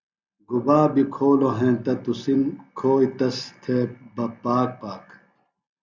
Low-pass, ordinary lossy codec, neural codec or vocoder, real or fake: 7.2 kHz; Opus, 64 kbps; none; real